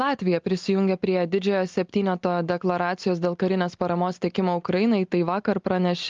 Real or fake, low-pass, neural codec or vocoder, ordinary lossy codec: real; 7.2 kHz; none; Opus, 32 kbps